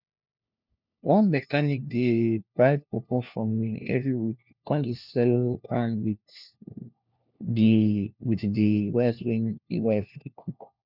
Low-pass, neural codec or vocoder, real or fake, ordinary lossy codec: 5.4 kHz; codec, 16 kHz, 1 kbps, FunCodec, trained on LibriTTS, 50 frames a second; fake; none